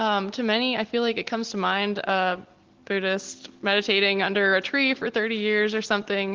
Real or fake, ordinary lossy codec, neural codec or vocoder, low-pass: real; Opus, 16 kbps; none; 7.2 kHz